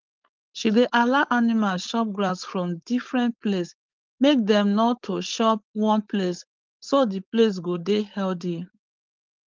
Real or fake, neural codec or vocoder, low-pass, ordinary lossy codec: fake; codec, 16 kHz, 4.8 kbps, FACodec; 7.2 kHz; Opus, 32 kbps